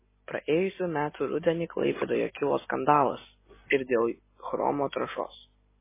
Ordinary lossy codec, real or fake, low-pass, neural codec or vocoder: MP3, 16 kbps; real; 3.6 kHz; none